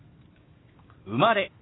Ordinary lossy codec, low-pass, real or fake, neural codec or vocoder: AAC, 16 kbps; 7.2 kHz; real; none